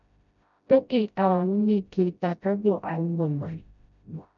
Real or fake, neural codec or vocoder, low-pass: fake; codec, 16 kHz, 0.5 kbps, FreqCodec, smaller model; 7.2 kHz